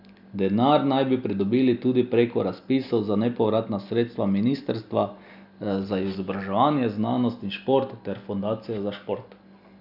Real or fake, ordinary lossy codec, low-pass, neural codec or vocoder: real; none; 5.4 kHz; none